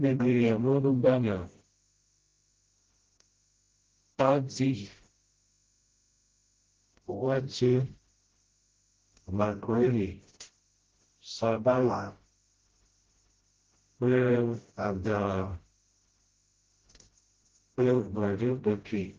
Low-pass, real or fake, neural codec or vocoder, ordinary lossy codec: 7.2 kHz; fake; codec, 16 kHz, 0.5 kbps, FreqCodec, smaller model; Opus, 16 kbps